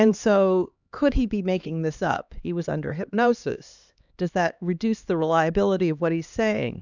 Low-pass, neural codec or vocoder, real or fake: 7.2 kHz; codec, 16 kHz, 2 kbps, X-Codec, HuBERT features, trained on LibriSpeech; fake